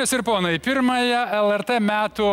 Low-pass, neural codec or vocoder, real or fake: 19.8 kHz; none; real